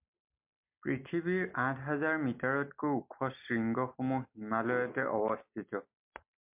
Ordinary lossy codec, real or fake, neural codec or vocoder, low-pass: MP3, 32 kbps; real; none; 3.6 kHz